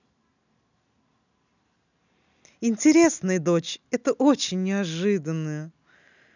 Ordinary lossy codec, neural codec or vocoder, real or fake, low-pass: none; none; real; 7.2 kHz